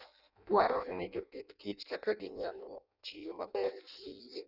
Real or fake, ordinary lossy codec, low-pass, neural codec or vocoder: fake; none; 5.4 kHz; codec, 16 kHz in and 24 kHz out, 0.6 kbps, FireRedTTS-2 codec